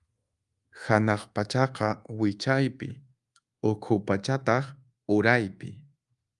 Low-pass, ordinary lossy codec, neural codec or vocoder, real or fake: 10.8 kHz; Opus, 32 kbps; codec, 24 kHz, 1.2 kbps, DualCodec; fake